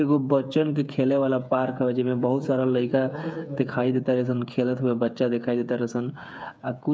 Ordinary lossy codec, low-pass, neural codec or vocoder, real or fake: none; none; codec, 16 kHz, 8 kbps, FreqCodec, smaller model; fake